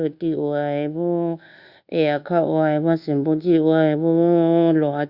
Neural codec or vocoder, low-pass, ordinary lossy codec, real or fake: codec, 24 kHz, 1.2 kbps, DualCodec; 5.4 kHz; Opus, 64 kbps; fake